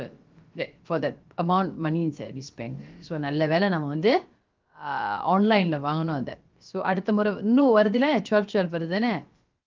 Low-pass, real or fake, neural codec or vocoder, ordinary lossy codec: 7.2 kHz; fake; codec, 16 kHz, about 1 kbps, DyCAST, with the encoder's durations; Opus, 32 kbps